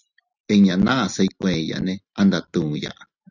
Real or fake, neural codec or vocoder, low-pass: real; none; 7.2 kHz